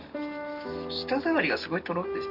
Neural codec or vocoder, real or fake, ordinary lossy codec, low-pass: none; real; Opus, 64 kbps; 5.4 kHz